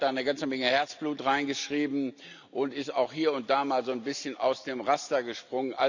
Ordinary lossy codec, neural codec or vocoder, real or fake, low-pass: none; none; real; 7.2 kHz